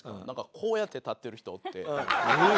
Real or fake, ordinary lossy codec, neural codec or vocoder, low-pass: real; none; none; none